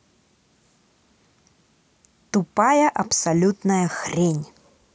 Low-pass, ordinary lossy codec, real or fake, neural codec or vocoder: none; none; real; none